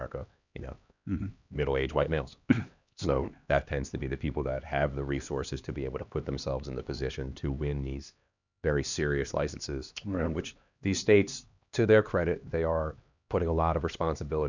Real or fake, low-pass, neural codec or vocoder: fake; 7.2 kHz; codec, 16 kHz, 2 kbps, X-Codec, HuBERT features, trained on LibriSpeech